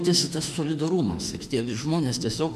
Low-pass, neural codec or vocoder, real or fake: 14.4 kHz; autoencoder, 48 kHz, 32 numbers a frame, DAC-VAE, trained on Japanese speech; fake